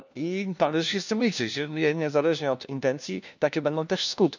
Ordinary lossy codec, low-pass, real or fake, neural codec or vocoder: none; 7.2 kHz; fake; codec, 16 kHz, 1 kbps, FunCodec, trained on LibriTTS, 50 frames a second